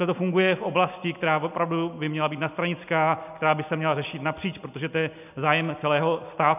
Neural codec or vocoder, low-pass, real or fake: none; 3.6 kHz; real